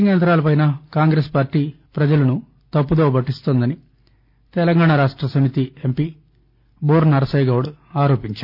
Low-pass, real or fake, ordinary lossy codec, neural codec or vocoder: 5.4 kHz; real; none; none